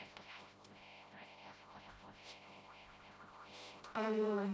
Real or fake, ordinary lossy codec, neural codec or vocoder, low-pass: fake; none; codec, 16 kHz, 0.5 kbps, FreqCodec, smaller model; none